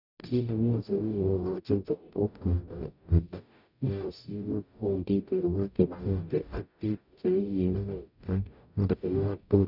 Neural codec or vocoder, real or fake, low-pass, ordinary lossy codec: codec, 44.1 kHz, 0.9 kbps, DAC; fake; 5.4 kHz; none